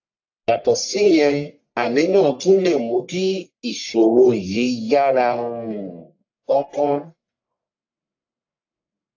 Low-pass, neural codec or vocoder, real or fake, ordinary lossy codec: 7.2 kHz; codec, 44.1 kHz, 1.7 kbps, Pupu-Codec; fake; AAC, 48 kbps